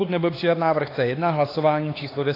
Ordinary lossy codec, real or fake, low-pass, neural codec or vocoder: AAC, 24 kbps; fake; 5.4 kHz; codec, 16 kHz, 4 kbps, X-Codec, HuBERT features, trained on LibriSpeech